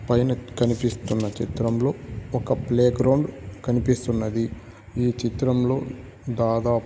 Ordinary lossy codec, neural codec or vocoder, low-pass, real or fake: none; none; none; real